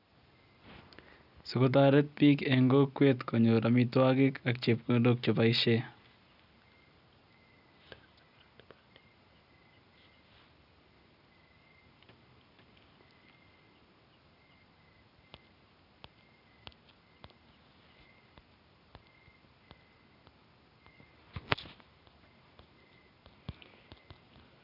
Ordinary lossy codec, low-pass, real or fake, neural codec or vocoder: none; 5.4 kHz; real; none